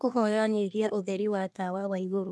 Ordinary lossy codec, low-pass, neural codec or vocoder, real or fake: none; none; codec, 24 kHz, 1 kbps, SNAC; fake